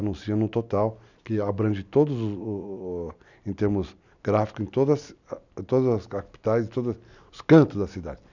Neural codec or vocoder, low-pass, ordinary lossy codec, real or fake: none; 7.2 kHz; none; real